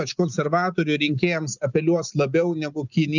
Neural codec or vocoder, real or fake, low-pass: none; real; 7.2 kHz